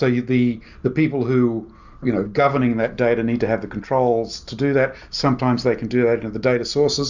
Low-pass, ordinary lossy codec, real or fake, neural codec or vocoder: 7.2 kHz; Opus, 64 kbps; real; none